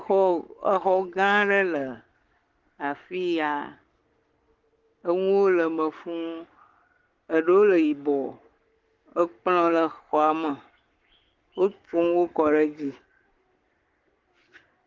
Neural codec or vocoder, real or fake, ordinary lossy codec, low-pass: codec, 44.1 kHz, 7.8 kbps, Pupu-Codec; fake; Opus, 16 kbps; 7.2 kHz